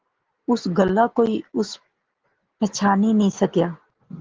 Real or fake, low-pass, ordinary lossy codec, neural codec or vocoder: real; 7.2 kHz; Opus, 16 kbps; none